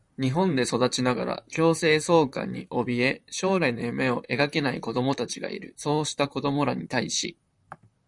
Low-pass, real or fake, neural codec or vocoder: 10.8 kHz; fake; vocoder, 44.1 kHz, 128 mel bands, Pupu-Vocoder